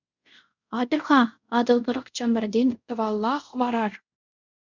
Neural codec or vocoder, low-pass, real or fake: codec, 24 kHz, 0.5 kbps, DualCodec; 7.2 kHz; fake